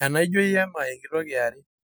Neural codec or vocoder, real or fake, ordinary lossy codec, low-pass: none; real; none; none